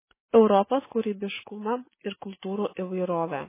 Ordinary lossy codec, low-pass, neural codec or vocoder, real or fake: MP3, 16 kbps; 3.6 kHz; none; real